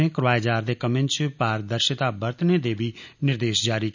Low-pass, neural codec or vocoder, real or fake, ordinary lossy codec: 7.2 kHz; none; real; none